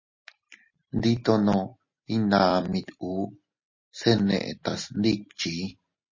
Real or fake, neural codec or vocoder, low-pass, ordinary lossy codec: real; none; 7.2 kHz; MP3, 32 kbps